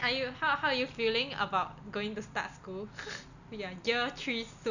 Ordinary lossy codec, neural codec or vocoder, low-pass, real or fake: none; none; 7.2 kHz; real